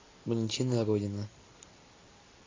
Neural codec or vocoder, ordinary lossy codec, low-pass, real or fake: none; AAC, 32 kbps; 7.2 kHz; real